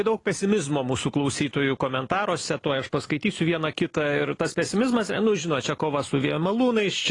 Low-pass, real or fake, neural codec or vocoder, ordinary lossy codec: 10.8 kHz; real; none; AAC, 32 kbps